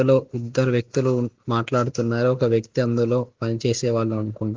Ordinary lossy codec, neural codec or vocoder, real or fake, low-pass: Opus, 32 kbps; none; real; 7.2 kHz